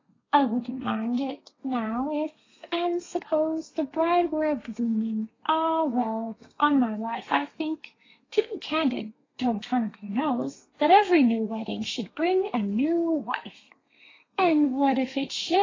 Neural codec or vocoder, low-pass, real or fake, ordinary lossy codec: codec, 32 kHz, 1.9 kbps, SNAC; 7.2 kHz; fake; AAC, 32 kbps